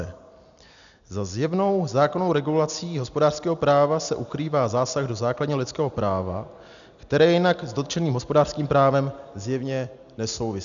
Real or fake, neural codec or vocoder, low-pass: real; none; 7.2 kHz